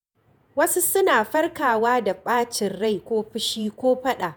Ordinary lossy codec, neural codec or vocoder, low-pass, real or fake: none; vocoder, 48 kHz, 128 mel bands, Vocos; none; fake